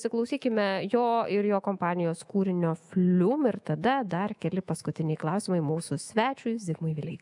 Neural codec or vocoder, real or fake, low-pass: autoencoder, 48 kHz, 128 numbers a frame, DAC-VAE, trained on Japanese speech; fake; 10.8 kHz